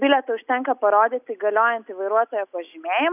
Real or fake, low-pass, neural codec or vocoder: real; 3.6 kHz; none